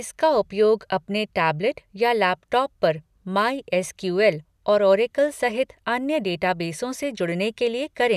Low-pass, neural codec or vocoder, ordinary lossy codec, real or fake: 14.4 kHz; none; none; real